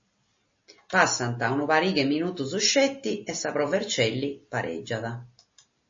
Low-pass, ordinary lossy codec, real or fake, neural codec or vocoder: 7.2 kHz; MP3, 32 kbps; real; none